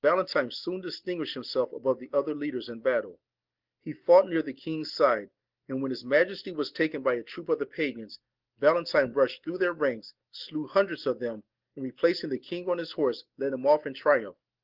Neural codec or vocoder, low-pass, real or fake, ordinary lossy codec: none; 5.4 kHz; real; Opus, 16 kbps